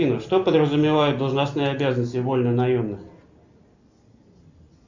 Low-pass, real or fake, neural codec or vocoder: 7.2 kHz; real; none